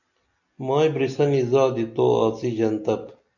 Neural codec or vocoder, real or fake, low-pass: none; real; 7.2 kHz